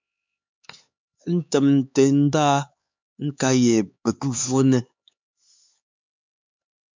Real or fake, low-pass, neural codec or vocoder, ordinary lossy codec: fake; 7.2 kHz; codec, 16 kHz, 4 kbps, X-Codec, HuBERT features, trained on LibriSpeech; MP3, 64 kbps